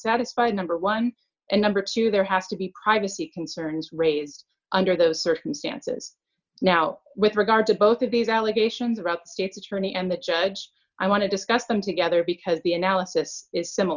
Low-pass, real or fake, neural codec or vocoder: 7.2 kHz; real; none